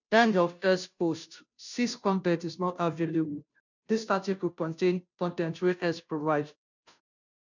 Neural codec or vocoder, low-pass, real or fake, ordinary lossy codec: codec, 16 kHz, 0.5 kbps, FunCodec, trained on Chinese and English, 25 frames a second; 7.2 kHz; fake; none